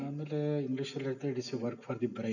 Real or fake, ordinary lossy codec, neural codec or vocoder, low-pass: real; none; none; 7.2 kHz